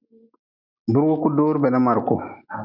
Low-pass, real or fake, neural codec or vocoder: 5.4 kHz; real; none